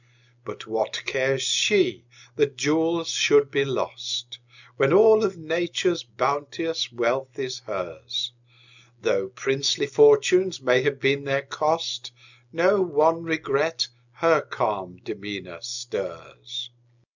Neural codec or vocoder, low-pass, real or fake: none; 7.2 kHz; real